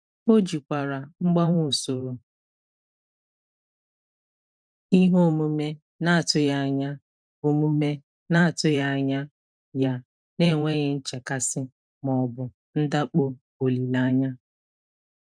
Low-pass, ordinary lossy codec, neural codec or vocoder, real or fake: 9.9 kHz; none; vocoder, 44.1 kHz, 128 mel bands every 512 samples, BigVGAN v2; fake